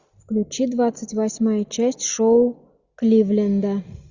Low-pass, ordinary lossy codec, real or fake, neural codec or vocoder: 7.2 kHz; Opus, 64 kbps; real; none